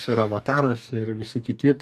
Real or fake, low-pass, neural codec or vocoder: fake; 14.4 kHz; codec, 44.1 kHz, 2.6 kbps, DAC